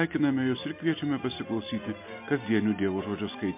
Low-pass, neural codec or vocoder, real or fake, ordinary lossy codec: 3.6 kHz; none; real; MP3, 32 kbps